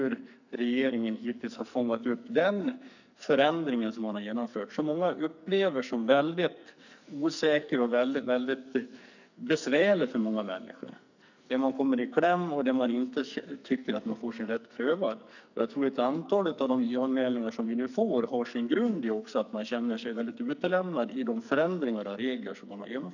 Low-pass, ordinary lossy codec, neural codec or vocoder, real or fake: 7.2 kHz; none; codec, 44.1 kHz, 2.6 kbps, SNAC; fake